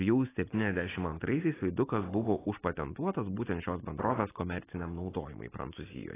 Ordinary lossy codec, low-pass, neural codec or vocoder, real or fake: AAC, 16 kbps; 3.6 kHz; none; real